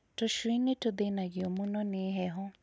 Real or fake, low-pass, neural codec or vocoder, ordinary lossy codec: real; none; none; none